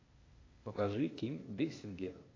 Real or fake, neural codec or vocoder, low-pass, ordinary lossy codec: fake; codec, 16 kHz, 0.8 kbps, ZipCodec; 7.2 kHz; AAC, 32 kbps